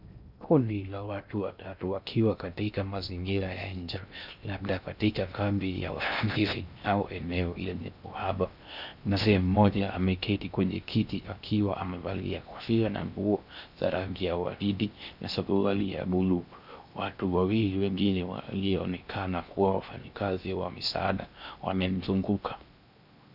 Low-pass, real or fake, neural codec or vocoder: 5.4 kHz; fake; codec, 16 kHz in and 24 kHz out, 0.6 kbps, FocalCodec, streaming, 2048 codes